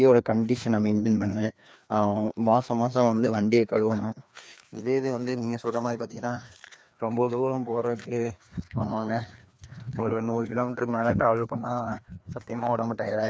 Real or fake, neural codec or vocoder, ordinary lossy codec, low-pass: fake; codec, 16 kHz, 2 kbps, FreqCodec, larger model; none; none